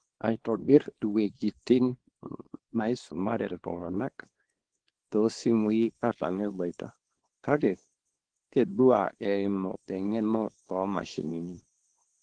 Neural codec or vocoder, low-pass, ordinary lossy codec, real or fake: codec, 24 kHz, 0.9 kbps, WavTokenizer, small release; 9.9 kHz; Opus, 16 kbps; fake